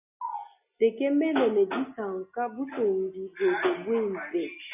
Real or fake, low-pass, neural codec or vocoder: real; 3.6 kHz; none